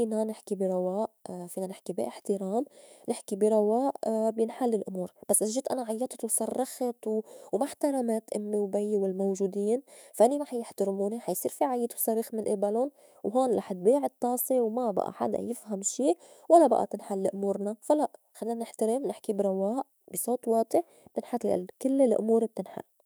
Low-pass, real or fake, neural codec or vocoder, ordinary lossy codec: none; fake; autoencoder, 48 kHz, 128 numbers a frame, DAC-VAE, trained on Japanese speech; none